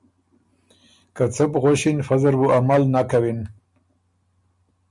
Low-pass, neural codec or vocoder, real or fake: 10.8 kHz; none; real